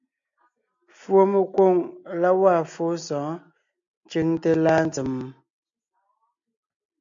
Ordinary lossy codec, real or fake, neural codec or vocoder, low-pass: AAC, 64 kbps; real; none; 7.2 kHz